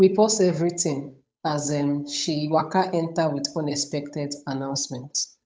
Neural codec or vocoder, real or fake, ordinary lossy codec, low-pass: codec, 16 kHz, 8 kbps, FunCodec, trained on Chinese and English, 25 frames a second; fake; none; none